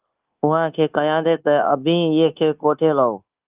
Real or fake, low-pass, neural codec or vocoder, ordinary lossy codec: fake; 3.6 kHz; codec, 16 kHz, 0.9 kbps, LongCat-Audio-Codec; Opus, 24 kbps